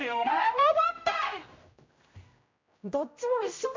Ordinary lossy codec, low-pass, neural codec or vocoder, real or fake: MP3, 64 kbps; 7.2 kHz; codec, 16 kHz, 0.5 kbps, X-Codec, HuBERT features, trained on general audio; fake